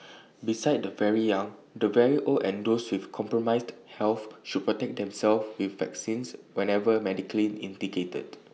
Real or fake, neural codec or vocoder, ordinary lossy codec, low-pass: real; none; none; none